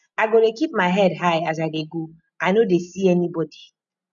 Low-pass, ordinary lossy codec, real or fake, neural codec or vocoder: 7.2 kHz; none; real; none